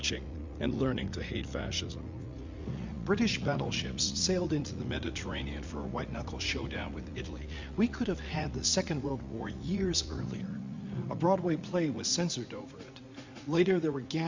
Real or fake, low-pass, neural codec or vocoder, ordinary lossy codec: fake; 7.2 kHz; vocoder, 44.1 kHz, 80 mel bands, Vocos; MP3, 64 kbps